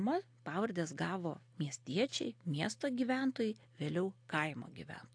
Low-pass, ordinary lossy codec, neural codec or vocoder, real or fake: 9.9 kHz; AAC, 48 kbps; none; real